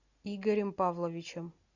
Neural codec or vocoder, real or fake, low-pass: none; real; 7.2 kHz